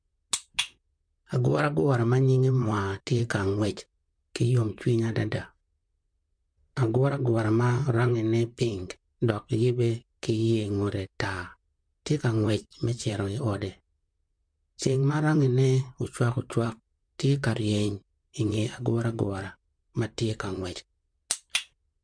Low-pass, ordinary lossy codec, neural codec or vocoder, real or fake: 9.9 kHz; MP3, 64 kbps; vocoder, 44.1 kHz, 128 mel bands, Pupu-Vocoder; fake